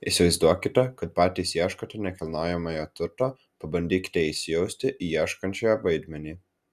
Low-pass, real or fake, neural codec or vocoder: 14.4 kHz; real; none